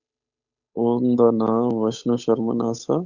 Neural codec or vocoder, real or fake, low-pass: codec, 16 kHz, 8 kbps, FunCodec, trained on Chinese and English, 25 frames a second; fake; 7.2 kHz